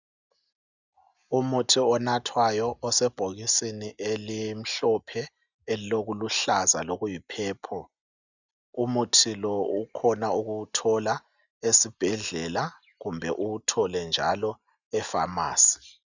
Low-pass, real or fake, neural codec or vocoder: 7.2 kHz; real; none